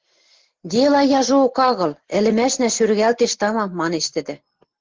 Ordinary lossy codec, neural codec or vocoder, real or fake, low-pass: Opus, 16 kbps; none; real; 7.2 kHz